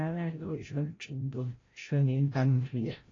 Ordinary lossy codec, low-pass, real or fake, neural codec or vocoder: AAC, 32 kbps; 7.2 kHz; fake; codec, 16 kHz, 0.5 kbps, FreqCodec, larger model